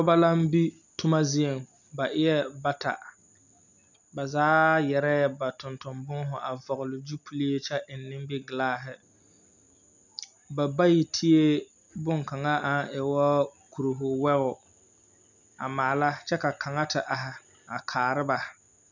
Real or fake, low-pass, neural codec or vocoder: real; 7.2 kHz; none